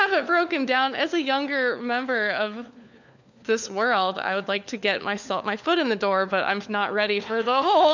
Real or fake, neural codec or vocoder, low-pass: fake; codec, 16 kHz, 4 kbps, FunCodec, trained on LibriTTS, 50 frames a second; 7.2 kHz